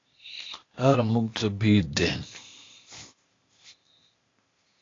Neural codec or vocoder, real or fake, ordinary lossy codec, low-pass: codec, 16 kHz, 0.8 kbps, ZipCodec; fake; AAC, 32 kbps; 7.2 kHz